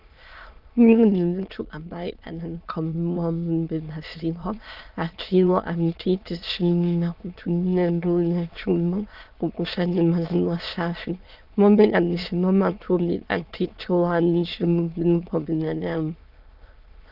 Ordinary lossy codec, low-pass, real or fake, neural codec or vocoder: Opus, 32 kbps; 5.4 kHz; fake; autoencoder, 22.05 kHz, a latent of 192 numbers a frame, VITS, trained on many speakers